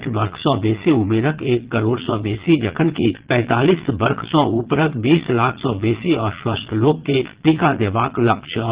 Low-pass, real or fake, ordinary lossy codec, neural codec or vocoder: 3.6 kHz; fake; Opus, 16 kbps; vocoder, 22.05 kHz, 80 mel bands, Vocos